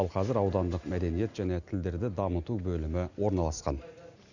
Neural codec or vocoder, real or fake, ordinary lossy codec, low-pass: none; real; none; 7.2 kHz